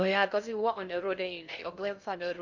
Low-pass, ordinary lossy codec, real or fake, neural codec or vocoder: 7.2 kHz; none; fake; codec, 16 kHz in and 24 kHz out, 0.6 kbps, FocalCodec, streaming, 2048 codes